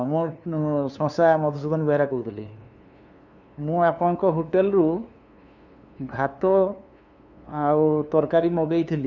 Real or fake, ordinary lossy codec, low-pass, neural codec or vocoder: fake; none; 7.2 kHz; codec, 16 kHz, 2 kbps, FunCodec, trained on LibriTTS, 25 frames a second